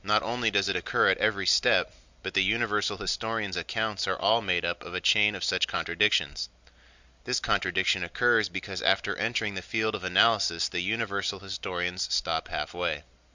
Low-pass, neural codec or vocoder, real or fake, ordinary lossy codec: 7.2 kHz; none; real; Opus, 64 kbps